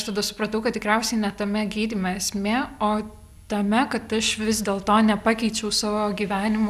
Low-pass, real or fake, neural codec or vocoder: 14.4 kHz; real; none